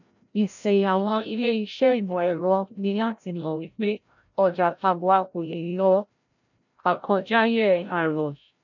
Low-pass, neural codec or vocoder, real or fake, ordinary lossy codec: 7.2 kHz; codec, 16 kHz, 0.5 kbps, FreqCodec, larger model; fake; none